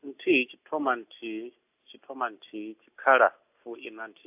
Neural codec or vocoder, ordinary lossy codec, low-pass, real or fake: none; AAC, 32 kbps; 3.6 kHz; real